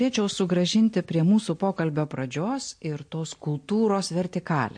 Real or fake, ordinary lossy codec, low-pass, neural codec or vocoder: real; MP3, 48 kbps; 9.9 kHz; none